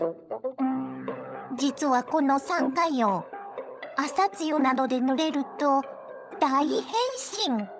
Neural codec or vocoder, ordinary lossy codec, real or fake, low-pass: codec, 16 kHz, 16 kbps, FunCodec, trained on LibriTTS, 50 frames a second; none; fake; none